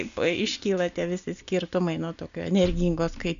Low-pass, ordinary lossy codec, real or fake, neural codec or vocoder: 7.2 kHz; MP3, 96 kbps; real; none